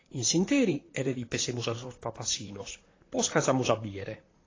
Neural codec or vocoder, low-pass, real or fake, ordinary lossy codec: vocoder, 44.1 kHz, 128 mel bands, Pupu-Vocoder; 7.2 kHz; fake; AAC, 32 kbps